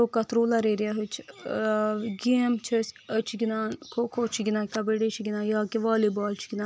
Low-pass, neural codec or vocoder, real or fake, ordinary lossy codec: none; none; real; none